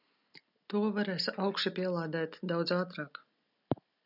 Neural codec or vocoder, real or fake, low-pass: none; real; 5.4 kHz